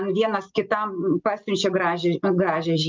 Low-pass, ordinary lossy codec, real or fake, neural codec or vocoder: 7.2 kHz; Opus, 24 kbps; real; none